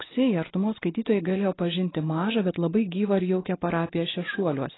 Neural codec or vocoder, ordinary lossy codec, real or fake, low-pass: none; AAC, 16 kbps; real; 7.2 kHz